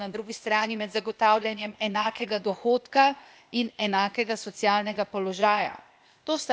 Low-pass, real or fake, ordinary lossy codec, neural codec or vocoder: none; fake; none; codec, 16 kHz, 0.8 kbps, ZipCodec